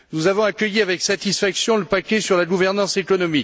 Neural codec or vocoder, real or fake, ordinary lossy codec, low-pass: none; real; none; none